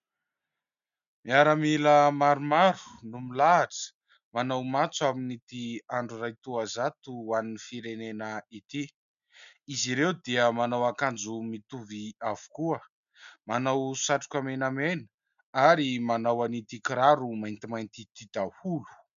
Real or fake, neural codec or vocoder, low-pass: real; none; 7.2 kHz